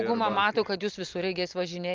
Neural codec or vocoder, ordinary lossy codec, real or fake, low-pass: none; Opus, 24 kbps; real; 7.2 kHz